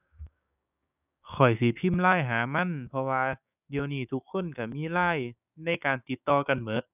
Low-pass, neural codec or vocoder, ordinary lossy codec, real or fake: 3.6 kHz; codec, 16 kHz, 6 kbps, DAC; none; fake